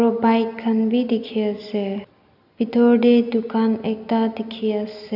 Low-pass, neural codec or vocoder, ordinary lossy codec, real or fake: 5.4 kHz; none; none; real